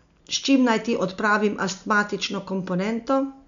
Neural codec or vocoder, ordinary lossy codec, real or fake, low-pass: none; none; real; 7.2 kHz